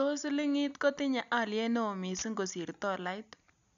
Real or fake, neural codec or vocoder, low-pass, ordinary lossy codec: real; none; 7.2 kHz; none